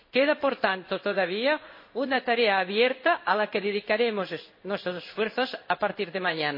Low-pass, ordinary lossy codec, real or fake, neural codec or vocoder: 5.4 kHz; MP3, 24 kbps; fake; codec, 16 kHz in and 24 kHz out, 1 kbps, XY-Tokenizer